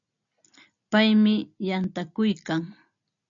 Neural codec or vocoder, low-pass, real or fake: none; 7.2 kHz; real